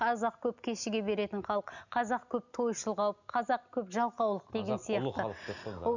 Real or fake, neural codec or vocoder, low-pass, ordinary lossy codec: real; none; 7.2 kHz; none